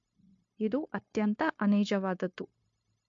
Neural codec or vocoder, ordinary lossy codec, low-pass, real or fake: codec, 16 kHz, 0.9 kbps, LongCat-Audio-Codec; MP3, 48 kbps; 7.2 kHz; fake